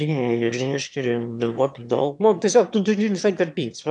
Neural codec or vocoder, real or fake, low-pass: autoencoder, 22.05 kHz, a latent of 192 numbers a frame, VITS, trained on one speaker; fake; 9.9 kHz